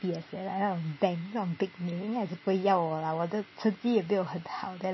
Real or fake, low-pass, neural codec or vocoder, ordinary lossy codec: real; 7.2 kHz; none; MP3, 24 kbps